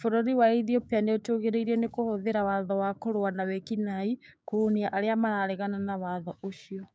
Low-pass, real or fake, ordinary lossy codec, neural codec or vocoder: none; fake; none; codec, 16 kHz, 6 kbps, DAC